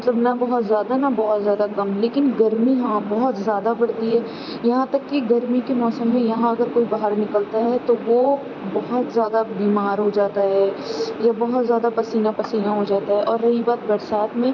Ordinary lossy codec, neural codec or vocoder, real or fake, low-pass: none; vocoder, 44.1 kHz, 128 mel bands, Pupu-Vocoder; fake; 7.2 kHz